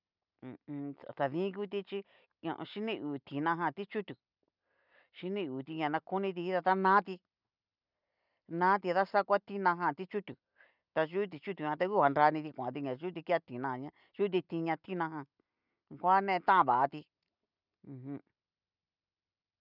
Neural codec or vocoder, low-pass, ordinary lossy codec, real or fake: none; 5.4 kHz; none; real